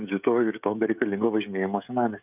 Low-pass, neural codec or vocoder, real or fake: 3.6 kHz; codec, 16 kHz, 16 kbps, FreqCodec, smaller model; fake